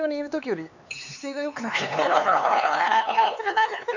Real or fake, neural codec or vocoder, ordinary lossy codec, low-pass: fake; codec, 16 kHz, 4 kbps, X-Codec, HuBERT features, trained on LibriSpeech; none; 7.2 kHz